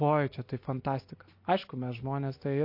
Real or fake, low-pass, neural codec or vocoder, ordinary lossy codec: real; 5.4 kHz; none; MP3, 32 kbps